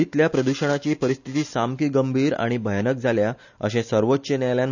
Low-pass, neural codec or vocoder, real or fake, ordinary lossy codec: 7.2 kHz; none; real; none